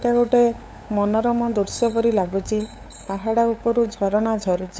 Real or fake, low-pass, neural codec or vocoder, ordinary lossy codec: fake; none; codec, 16 kHz, 8 kbps, FunCodec, trained on LibriTTS, 25 frames a second; none